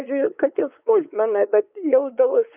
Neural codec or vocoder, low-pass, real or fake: codec, 16 kHz, 4 kbps, X-Codec, WavLM features, trained on Multilingual LibriSpeech; 3.6 kHz; fake